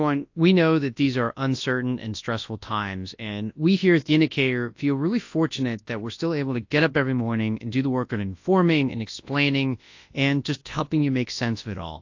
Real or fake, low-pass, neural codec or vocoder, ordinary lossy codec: fake; 7.2 kHz; codec, 24 kHz, 0.9 kbps, WavTokenizer, large speech release; AAC, 48 kbps